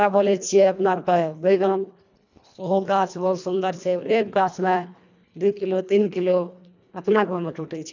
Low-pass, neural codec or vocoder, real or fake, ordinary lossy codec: 7.2 kHz; codec, 24 kHz, 1.5 kbps, HILCodec; fake; none